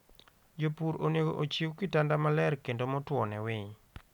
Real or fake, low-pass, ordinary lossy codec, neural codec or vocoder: real; 19.8 kHz; none; none